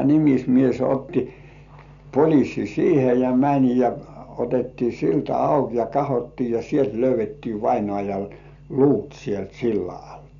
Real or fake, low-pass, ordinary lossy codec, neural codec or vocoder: real; 7.2 kHz; none; none